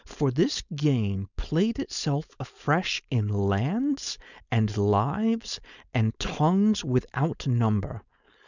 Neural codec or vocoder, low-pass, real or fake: codec, 16 kHz, 4.8 kbps, FACodec; 7.2 kHz; fake